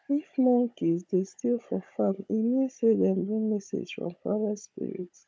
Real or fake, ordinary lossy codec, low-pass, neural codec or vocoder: fake; none; none; codec, 16 kHz, 16 kbps, FunCodec, trained on Chinese and English, 50 frames a second